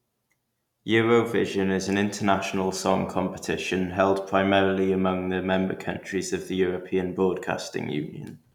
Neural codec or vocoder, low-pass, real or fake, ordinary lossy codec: none; 19.8 kHz; real; none